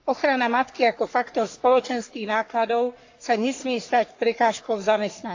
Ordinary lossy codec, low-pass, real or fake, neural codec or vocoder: AAC, 48 kbps; 7.2 kHz; fake; codec, 44.1 kHz, 3.4 kbps, Pupu-Codec